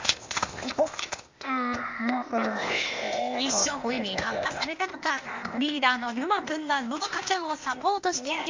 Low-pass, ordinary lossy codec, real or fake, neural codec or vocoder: 7.2 kHz; MP3, 48 kbps; fake; codec, 16 kHz, 0.8 kbps, ZipCodec